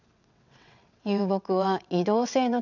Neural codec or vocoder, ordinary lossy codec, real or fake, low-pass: vocoder, 22.05 kHz, 80 mel bands, WaveNeXt; none; fake; 7.2 kHz